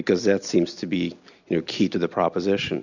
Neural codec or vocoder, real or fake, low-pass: none; real; 7.2 kHz